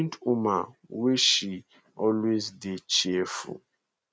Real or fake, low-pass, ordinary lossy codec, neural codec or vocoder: real; none; none; none